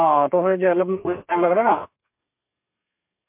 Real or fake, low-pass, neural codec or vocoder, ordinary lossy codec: fake; 3.6 kHz; codec, 32 kHz, 1.9 kbps, SNAC; MP3, 32 kbps